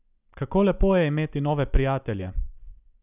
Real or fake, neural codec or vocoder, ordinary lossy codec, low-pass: real; none; none; 3.6 kHz